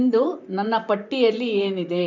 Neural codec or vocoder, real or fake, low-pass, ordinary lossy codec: vocoder, 44.1 kHz, 128 mel bands, Pupu-Vocoder; fake; 7.2 kHz; none